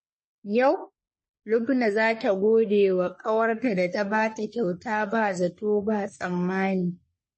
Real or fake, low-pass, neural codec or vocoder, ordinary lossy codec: fake; 10.8 kHz; codec, 44.1 kHz, 3.4 kbps, Pupu-Codec; MP3, 32 kbps